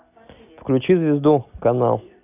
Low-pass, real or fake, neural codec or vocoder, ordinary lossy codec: 3.6 kHz; real; none; none